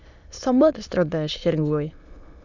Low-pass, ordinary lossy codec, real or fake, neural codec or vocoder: 7.2 kHz; none; fake; autoencoder, 22.05 kHz, a latent of 192 numbers a frame, VITS, trained on many speakers